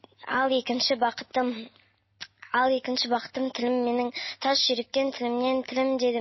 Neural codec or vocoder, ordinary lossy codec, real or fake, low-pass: none; MP3, 24 kbps; real; 7.2 kHz